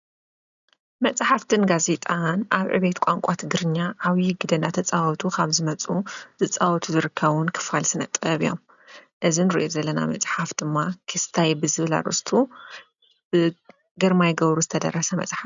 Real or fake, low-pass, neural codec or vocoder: real; 7.2 kHz; none